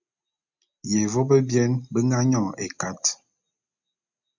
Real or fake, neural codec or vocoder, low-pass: real; none; 7.2 kHz